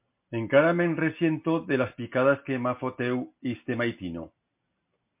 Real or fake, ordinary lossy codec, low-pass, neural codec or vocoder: real; MP3, 32 kbps; 3.6 kHz; none